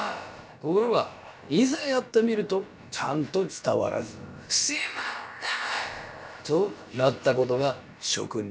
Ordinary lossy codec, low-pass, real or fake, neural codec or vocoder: none; none; fake; codec, 16 kHz, about 1 kbps, DyCAST, with the encoder's durations